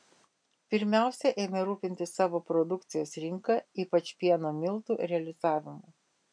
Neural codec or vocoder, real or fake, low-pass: none; real; 9.9 kHz